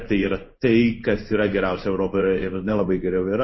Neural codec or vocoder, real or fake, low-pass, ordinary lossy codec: codec, 16 kHz in and 24 kHz out, 1 kbps, XY-Tokenizer; fake; 7.2 kHz; MP3, 24 kbps